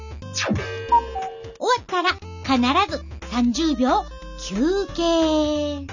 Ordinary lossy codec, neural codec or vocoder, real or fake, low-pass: none; none; real; 7.2 kHz